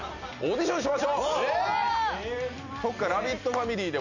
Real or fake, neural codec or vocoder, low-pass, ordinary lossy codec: real; none; 7.2 kHz; none